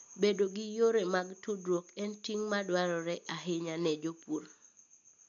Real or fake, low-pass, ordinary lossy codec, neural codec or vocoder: real; 7.2 kHz; none; none